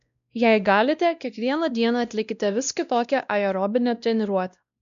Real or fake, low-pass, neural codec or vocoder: fake; 7.2 kHz; codec, 16 kHz, 1 kbps, X-Codec, WavLM features, trained on Multilingual LibriSpeech